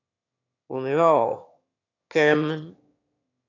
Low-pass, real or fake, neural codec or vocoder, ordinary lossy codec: 7.2 kHz; fake; autoencoder, 22.05 kHz, a latent of 192 numbers a frame, VITS, trained on one speaker; MP3, 64 kbps